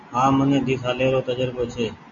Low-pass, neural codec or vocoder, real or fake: 7.2 kHz; none; real